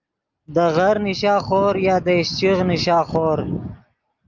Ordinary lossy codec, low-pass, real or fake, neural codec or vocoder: Opus, 24 kbps; 7.2 kHz; real; none